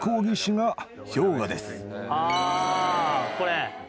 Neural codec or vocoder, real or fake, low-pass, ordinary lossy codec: none; real; none; none